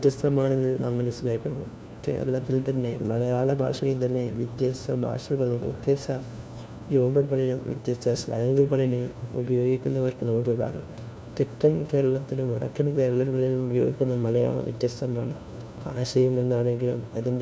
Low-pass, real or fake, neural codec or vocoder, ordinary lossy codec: none; fake; codec, 16 kHz, 1 kbps, FunCodec, trained on LibriTTS, 50 frames a second; none